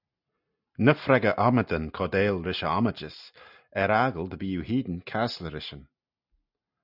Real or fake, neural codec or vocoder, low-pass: real; none; 5.4 kHz